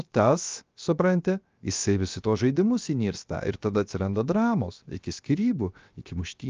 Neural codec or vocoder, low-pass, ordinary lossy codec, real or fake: codec, 16 kHz, 0.7 kbps, FocalCodec; 7.2 kHz; Opus, 24 kbps; fake